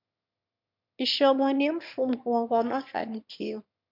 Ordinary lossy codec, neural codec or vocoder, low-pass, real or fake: MP3, 48 kbps; autoencoder, 22.05 kHz, a latent of 192 numbers a frame, VITS, trained on one speaker; 5.4 kHz; fake